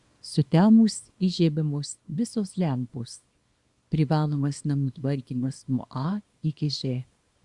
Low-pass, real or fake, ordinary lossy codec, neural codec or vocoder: 10.8 kHz; fake; Opus, 64 kbps; codec, 24 kHz, 0.9 kbps, WavTokenizer, small release